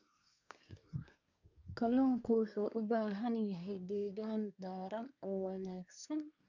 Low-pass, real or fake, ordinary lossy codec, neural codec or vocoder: 7.2 kHz; fake; none; codec, 24 kHz, 1 kbps, SNAC